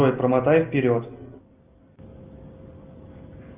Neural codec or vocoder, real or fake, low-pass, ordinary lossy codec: none; real; 3.6 kHz; Opus, 24 kbps